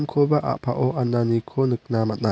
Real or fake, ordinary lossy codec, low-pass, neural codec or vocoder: real; none; none; none